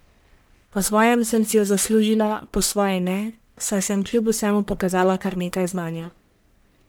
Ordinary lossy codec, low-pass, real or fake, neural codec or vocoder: none; none; fake; codec, 44.1 kHz, 1.7 kbps, Pupu-Codec